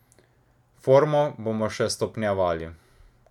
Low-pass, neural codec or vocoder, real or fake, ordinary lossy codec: 19.8 kHz; none; real; none